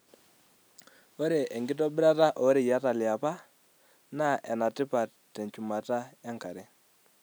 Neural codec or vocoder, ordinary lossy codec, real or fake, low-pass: none; none; real; none